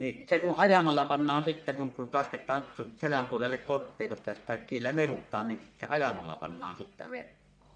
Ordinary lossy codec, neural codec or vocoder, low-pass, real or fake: none; codec, 44.1 kHz, 1.7 kbps, Pupu-Codec; 9.9 kHz; fake